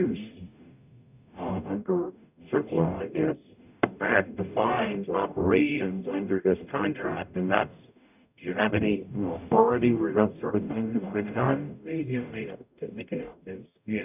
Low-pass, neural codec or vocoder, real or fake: 3.6 kHz; codec, 44.1 kHz, 0.9 kbps, DAC; fake